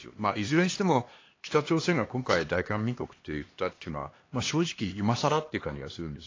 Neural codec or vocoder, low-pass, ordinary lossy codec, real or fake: codec, 16 kHz, 2 kbps, X-Codec, HuBERT features, trained on LibriSpeech; 7.2 kHz; AAC, 32 kbps; fake